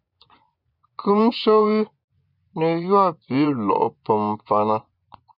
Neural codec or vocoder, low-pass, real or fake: vocoder, 24 kHz, 100 mel bands, Vocos; 5.4 kHz; fake